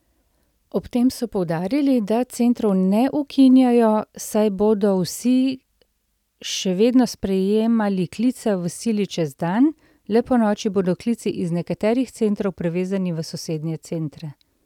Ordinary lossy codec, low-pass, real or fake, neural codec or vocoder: none; 19.8 kHz; real; none